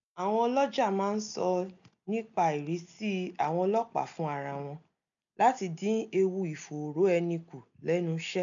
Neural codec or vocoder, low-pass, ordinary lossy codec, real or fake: none; 7.2 kHz; MP3, 96 kbps; real